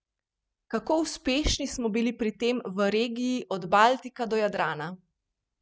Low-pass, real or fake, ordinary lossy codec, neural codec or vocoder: none; real; none; none